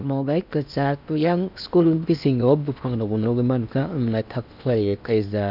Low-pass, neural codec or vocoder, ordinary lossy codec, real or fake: 5.4 kHz; codec, 16 kHz in and 24 kHz out, 0.8 kbps, FocalCodec, streaming, 65536 codes; none; fake